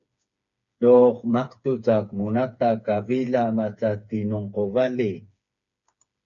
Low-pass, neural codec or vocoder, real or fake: 7.2 kHz; codec, 16 kHz, 4 kbps, FreqCodec, smaller model; fake